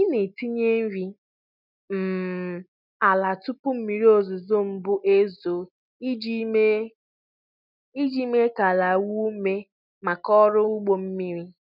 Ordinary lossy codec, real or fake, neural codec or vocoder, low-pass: none; real; none; 5.4 kHz